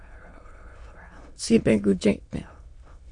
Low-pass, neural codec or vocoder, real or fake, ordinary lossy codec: 9.9 kHz; autoencoder, 22.05 kHz, a latent of 192 numbers a frame, VITS, trained on many speakers; fake; MP3, 48 kbps